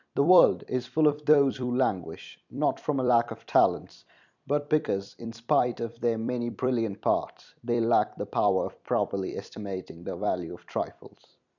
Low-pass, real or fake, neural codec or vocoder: 7.2 kHz; fake; vocoder, 44.1 kHz, 128 mel bands every 256 samples, BigVGAN v2